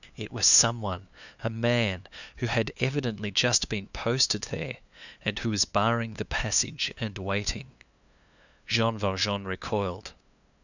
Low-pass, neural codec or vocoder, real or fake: 7.2 kHz; codec, 16 kHz, 2 kbps, FunCodec, trained on LibriTTS, 25 frames a second; fake